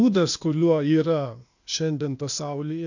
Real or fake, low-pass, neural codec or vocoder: fake; 7.2 kHz; codec, 16 kHz, 0.8 kbps, ZipCodec